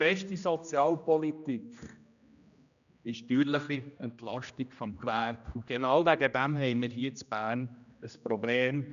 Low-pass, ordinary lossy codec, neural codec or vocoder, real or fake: 7.2 kHz; none; codec, 16 kHz, 1 kbps, X-Codec, HuBERT features, trained on general audio; fake